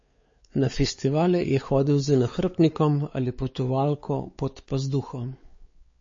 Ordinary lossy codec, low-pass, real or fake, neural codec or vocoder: MP3, 32 kbps; 7.2 kHz; fake; codec, 16 kHz, 4 kbps, X-Codec, WavLM features, trained on Multilingual LibriSpeech